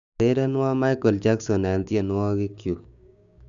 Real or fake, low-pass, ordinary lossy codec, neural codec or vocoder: fake; 7.2 kHz; none; codec, 16 kHz, 6 kbps, DAC